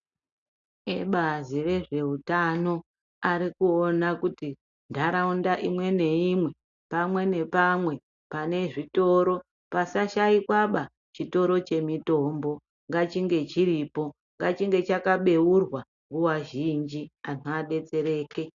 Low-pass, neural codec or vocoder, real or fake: 7.2 kHz; none; real